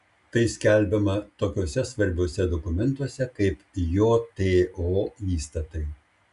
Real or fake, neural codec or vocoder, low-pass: real; none; 10.8 kHz